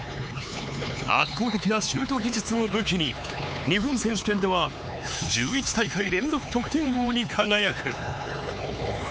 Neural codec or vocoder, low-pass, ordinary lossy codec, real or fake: codec, 16 kHz, 4 kbps, X-Codec, HuBERT features, trained on LibriSpeech; none; none; fake